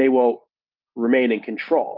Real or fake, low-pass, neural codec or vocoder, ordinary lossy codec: real; 5.4 kHz; none; Opus, 32 kbps